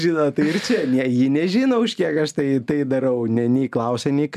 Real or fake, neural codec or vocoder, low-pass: real; none; 14.4 kHz